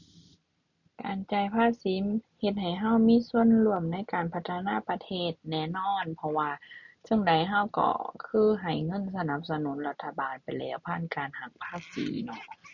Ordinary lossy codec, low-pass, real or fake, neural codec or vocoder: none; 7.2 kHz; real; none